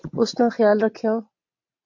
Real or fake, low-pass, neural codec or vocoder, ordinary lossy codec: fake; 7.2 kHz; vocoder, 22.05 kHz, 80 mel bands, WaveNeXt; MP3, 48 kbps